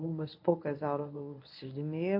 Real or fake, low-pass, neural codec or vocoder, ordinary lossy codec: fake; 5.4 kHz; codec, 24 kHz, 0.9 kbps, WavTokenizer, medium speech release version 1; MP3, 32 kbps